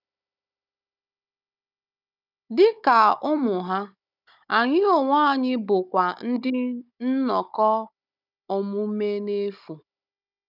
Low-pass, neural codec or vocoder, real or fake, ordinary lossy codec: 5.4 kHz; codec, 16 kHz, 16 kbps, FunCodec, trained on Chinese and English, 50 frames a second; fake; none